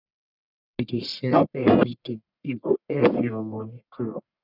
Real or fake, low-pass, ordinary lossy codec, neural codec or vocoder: fake; 5.4 kHz; none; codec, 44.1 kHz, 1.7 kbps, Pupu-Codec